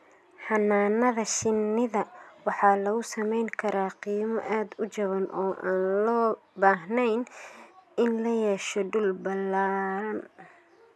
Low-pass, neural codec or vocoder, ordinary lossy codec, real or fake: none; none; none; real